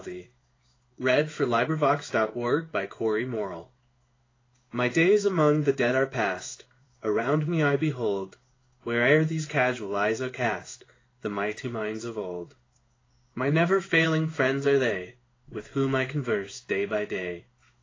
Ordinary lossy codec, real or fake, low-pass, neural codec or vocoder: AAC, 32 kbps; fake; 7.2 kHz; codec, 16 kHz in and 24 kHz out, 1 kbps, XY-Tokenizer